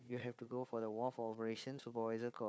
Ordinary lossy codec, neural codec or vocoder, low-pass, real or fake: none; codec, 16 kHz, 4 kbps, FunCodec, trained on Chinese and English, 50 frames a second; none; fake